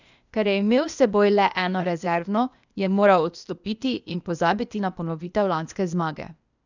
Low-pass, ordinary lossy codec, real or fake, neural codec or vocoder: 7.2 kHz; none; fake; codec, 16 kHz, 0.8 kbps, ZipCodec